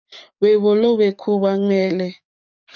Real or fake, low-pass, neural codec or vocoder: fake; 7.2 kHz; codec, 44.1 kHz, 7.8 kbps, DAC